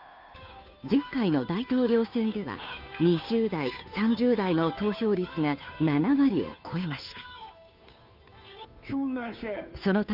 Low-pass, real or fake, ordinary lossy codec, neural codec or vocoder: 5.4 kHz; fake; none; codec, 16 kHz, 2 kbps, FunCodec, trained on Chinese and English, 25 frames a second